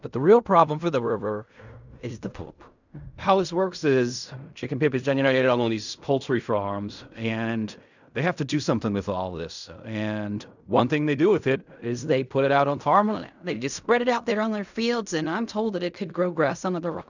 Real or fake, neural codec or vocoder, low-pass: fake; codec, 16 kHz in and 24 kHz out, 0.4 kbps, LongCat-Audio-Codec, fine tuned four codebook decoder; 7.2 kHz